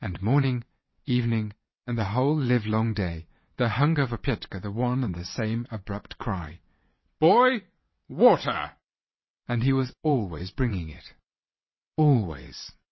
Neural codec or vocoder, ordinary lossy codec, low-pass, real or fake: vocoder, 22.05 kHz, 80 mel bands, WaveNeXt; MP3, 24 kbps; 7.2 kHz; fake